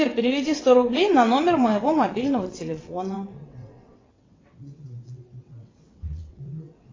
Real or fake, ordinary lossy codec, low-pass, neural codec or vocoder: fake; AAC, 32 kbps; 7.2 kHz; vocoder, 22.05 kHz, 80 mel bands, WaveNeXt